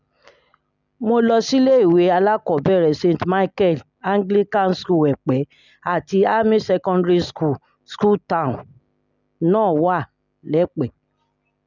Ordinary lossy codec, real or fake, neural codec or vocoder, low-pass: none; real; none; 7.2 kHz